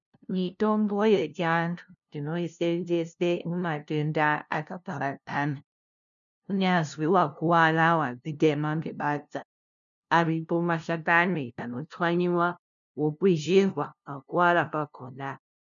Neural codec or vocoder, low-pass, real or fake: codec, 16 kHz, 0.5 kbps, FunCodec, trained on LibriTTS, 25 frames a second; 7.2 kHz; fake